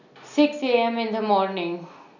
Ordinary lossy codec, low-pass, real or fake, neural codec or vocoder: none; 7.2 kHz; real; none